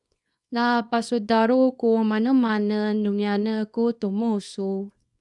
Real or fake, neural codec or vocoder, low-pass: fake; codec, 24 kHz, 0.9 kbps, WavTokenizer, small release; 10.8 kHz